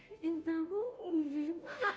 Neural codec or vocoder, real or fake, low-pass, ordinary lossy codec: codec, 16 kHz, 0.5 kbps, FunCodec, trained on Chinese and English, 25 frames a second; fake; none; none